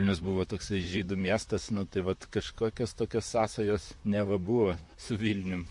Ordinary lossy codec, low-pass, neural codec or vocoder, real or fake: MP3, 48 kbps; 10.8 kHz; vocoder, 44.1 kHz, 128 mel bands, Pupu-Vocoder; fake